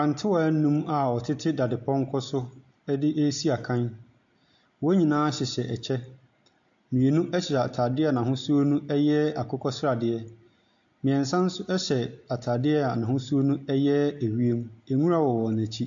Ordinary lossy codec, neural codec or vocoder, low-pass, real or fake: AAC, 64 kbps; none; 7.2 kHz; real